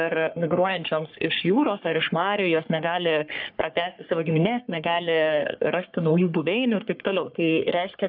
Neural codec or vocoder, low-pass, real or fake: codec, 44.1 kHz, 3.4 kbps, Pupu-Codec; 5.4 kHz; fake